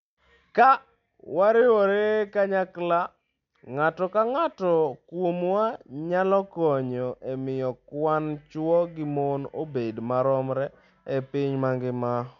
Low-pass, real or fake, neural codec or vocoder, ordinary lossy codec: 7.2 kHz; real; none; MP3, 96 kbps